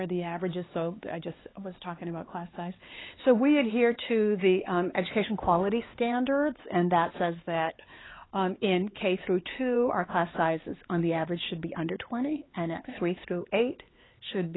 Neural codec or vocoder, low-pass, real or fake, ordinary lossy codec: codec, 16 kHz, 4 kbps, X-Codec, HuBERT features, trained on LibriSpeech; 7.2 kHz; fake; AAC, 16 kbps